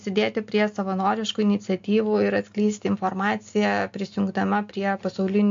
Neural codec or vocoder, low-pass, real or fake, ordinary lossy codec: none; 7.2 kHz; real; MP3, 48 kbps